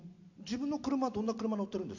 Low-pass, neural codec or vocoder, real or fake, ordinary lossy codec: 7.2 kHz; none; real; AAC, 48 kbps